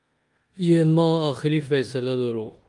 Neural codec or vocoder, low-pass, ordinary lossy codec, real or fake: codec, 16 kHz in and 24 kHz out, 0.9 kbps, LongCat-Audio-Codec, four codebook decoder; 10.8 kHz; Opus, 32 kbps; fake